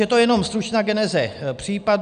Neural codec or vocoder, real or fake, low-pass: none; real; 9.9 kHz